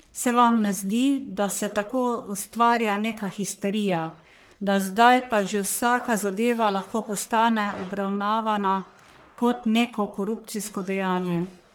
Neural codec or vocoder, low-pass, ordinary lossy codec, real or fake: codec, 44.1 kHz, 1.7 kbps, Pupu-Codec; none; none; fake